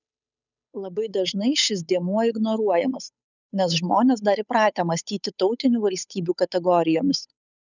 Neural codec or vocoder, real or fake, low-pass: codec, 16 kHz, 8 kbps, FunCodec, trained on Chinese and English, 25 frames a second; fake; 7.2 kHz